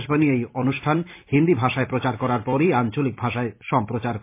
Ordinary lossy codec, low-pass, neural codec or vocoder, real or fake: MP3, 24 kbps; 3.6 kHz; vocoder, 44.1 kHz, 128 mel bands every 256 samples, BigVGAN v2; fake